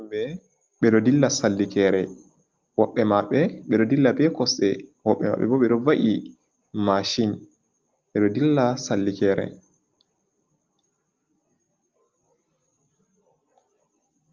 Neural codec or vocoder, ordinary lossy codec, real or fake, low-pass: none; Opus, 24 kbps; real; 7.2 kHz